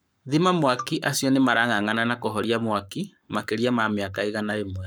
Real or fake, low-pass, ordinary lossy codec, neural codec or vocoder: fake; none; none; codec, 44.1 kHz, 7.8 kbps, Pupu-Codec